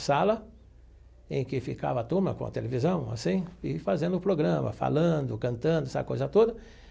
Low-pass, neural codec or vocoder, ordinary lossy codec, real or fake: none; none; none; real